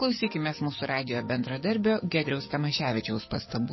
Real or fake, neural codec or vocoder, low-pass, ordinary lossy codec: fake; codec, 44.1 kHz, 7.8 kbps, DAC; 7.2 kHz; MP3, 24 kbps